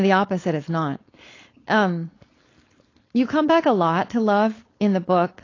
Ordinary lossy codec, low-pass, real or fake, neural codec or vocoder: AAC, 32 kbps; 7.2 kHz; fake; codec, 16 kHz, 4.8 kbps, FACodec